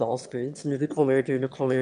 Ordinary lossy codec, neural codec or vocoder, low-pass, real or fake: none; autoencoder, 22.05 kHz, a latent of 192 numbers a frame, VITS, trained on one speaker; 9.9 kHz; fake